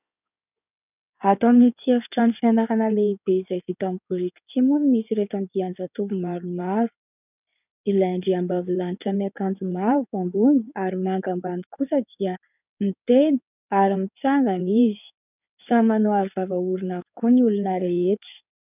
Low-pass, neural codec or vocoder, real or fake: 3.6 kHz; codec, 16 kHz in and 24 kHz out, 2.2 kbps, FireRedTTS-2 codec; fake